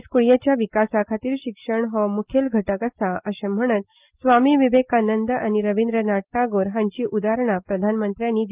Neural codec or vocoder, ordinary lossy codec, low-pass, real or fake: none; Opus, 32 kbps; 3.6 kHz; real